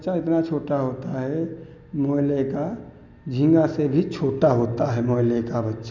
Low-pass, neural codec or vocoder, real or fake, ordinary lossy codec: 7.2 kHz; none; real; none